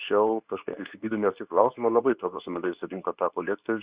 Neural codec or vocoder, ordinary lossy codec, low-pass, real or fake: codec, 24 kHz, 0.9 kbps, WavTokenizer, medium speech release version 2; Opus, 64 kbps; 3.6 kHz; fake